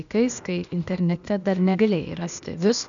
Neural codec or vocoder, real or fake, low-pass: codec, 16 kHz, 0.8 kbps, ZipCodec; fake; 7.2 kHz